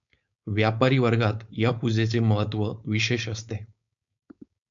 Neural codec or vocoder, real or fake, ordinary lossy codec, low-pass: codec, 16 kHz, 4.8 kbps, FACodec; fake; MP3, 64 kbps; 7.2 kHz